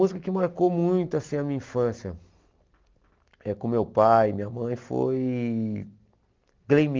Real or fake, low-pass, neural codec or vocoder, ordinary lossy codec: real; 7.2 kHz; none; Opus, 32 kbps